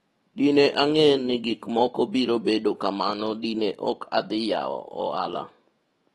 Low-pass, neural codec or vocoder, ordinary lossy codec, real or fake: 19.8 kHz; autoencoder, 48 kHz, 128 numbers a frame, DAC-VAE, trained on Japanese speech; AAC, 32 kbps; fake